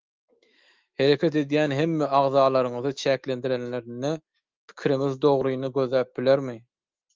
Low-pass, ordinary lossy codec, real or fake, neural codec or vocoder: 7.2 kHz; Opus, 32 kbps; fake; autoencoder, 48 kHz, 128 numbers a frame, DAC-VAE, trained on Japanese speech